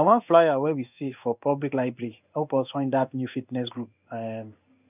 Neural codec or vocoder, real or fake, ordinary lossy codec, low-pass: codec, 16 kHz in and 24 kHz out, 1 kbps, XY-Tokenizer; fake; none; 3.6 kHz